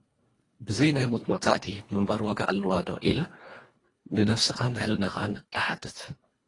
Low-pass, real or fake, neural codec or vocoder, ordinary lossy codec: 10.8 kHz; fake; codec, 24 kHz, 1.5 kbps, HILCodec; AAC, 32 kbps